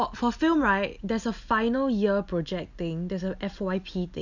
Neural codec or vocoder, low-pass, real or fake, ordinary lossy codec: none; 7.2 kHz; real; none